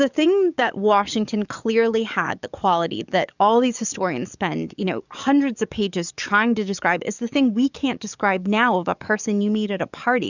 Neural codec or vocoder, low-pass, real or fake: codec, 44.1 kHz, 7.8 kbps, DAC; 7.2 kHz; fake